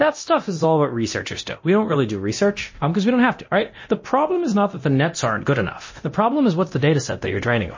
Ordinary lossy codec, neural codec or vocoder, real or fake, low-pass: MP3, 32 kbps; codec, 24 kHz, 0.9 kbps, DualCodec; fake; 7.2 kHz